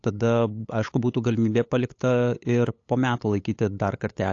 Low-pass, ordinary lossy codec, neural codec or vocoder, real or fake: 7.2 kHz; AAC, 48 kbps; codec, 16 kHz, 8 kbps, FunCodec, trained on LibriTTS, 25 frames a second; fake